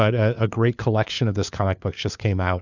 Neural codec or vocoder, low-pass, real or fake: codec, 16 kHz, 4 kbps, FunCodec, trained on LibriTTS, 50 frames a second; 7.2 kHz; fake